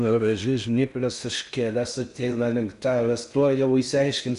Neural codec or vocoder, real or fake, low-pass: codec, 16 kHz in and 24 kHz out, 0.6 kbps, FocalCodec, streaming, 4096 codes; fake; 10.8 kHz